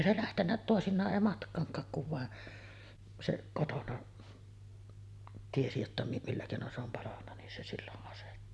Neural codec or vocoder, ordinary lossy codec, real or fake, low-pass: none; none; real; none